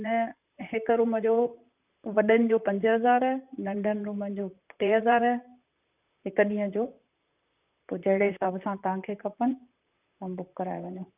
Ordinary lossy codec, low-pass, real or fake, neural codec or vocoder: none; 3.6 kHz; fake; vocoder, 44.1 kHz, 128 mel bands, Pupu-Vocoder